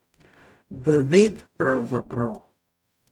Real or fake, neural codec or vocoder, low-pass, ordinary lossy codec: fake; codec, 44.1 kHz, 0.9 kbps, DAC; 19.8 kHz; none